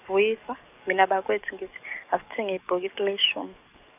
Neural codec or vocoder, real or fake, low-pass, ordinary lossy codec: codec, 44.1 kHz, 7.8 kbps, DAC; fake; 3.6 kHz; none